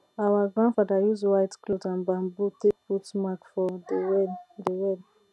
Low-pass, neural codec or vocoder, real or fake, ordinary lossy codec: none; none; real; none